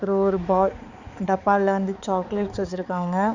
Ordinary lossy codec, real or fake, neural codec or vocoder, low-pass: Opus, 64 kbps; fake; codec, 16 kHz, 4 kbps, X-Codec, HuBERT features, trained on balanced general audio; 7.2 kHz